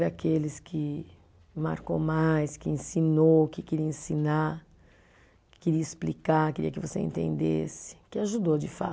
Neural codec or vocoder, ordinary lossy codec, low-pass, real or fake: none; none; none; real